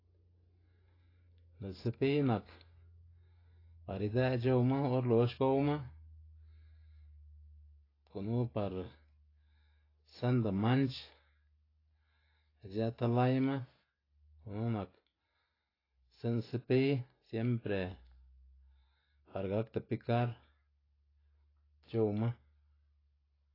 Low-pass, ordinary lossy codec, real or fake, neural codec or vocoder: 5.4 kHz; AAC, 24 kbps; real; none